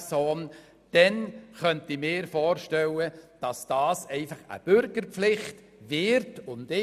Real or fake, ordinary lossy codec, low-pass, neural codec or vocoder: real; none; 14.4 kHz; none